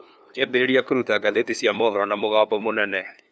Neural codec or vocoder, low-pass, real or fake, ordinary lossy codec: codec, 16 kHz, 2 kbps, FunCodec, trained on LibriTTS, 25 frames a second; none; fake; none